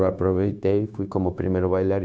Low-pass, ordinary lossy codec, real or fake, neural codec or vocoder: none; none; fake; codec, 16 kHz, 0.9 kbps, LongCat-Audio-Codec